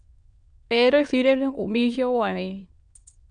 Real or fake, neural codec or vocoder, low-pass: fake; autoencoder, 22.05 kHz, a latent of 192 numbers a frame, VITS, trained on many speakers; 9.9 kHz